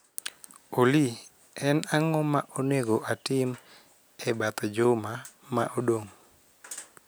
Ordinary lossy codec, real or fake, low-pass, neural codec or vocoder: none; real; none; none